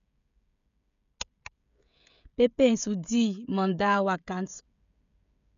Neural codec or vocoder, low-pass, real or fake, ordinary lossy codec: codec, 16 kHz, 16 kbps, FreqCodec, smaller model; 7.2 kHz; fake; none